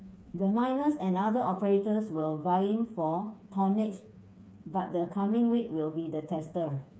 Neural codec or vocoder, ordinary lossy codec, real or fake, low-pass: codec, 16 kHz, 4 kbps, FreqCodec, smaller model; none; fake; none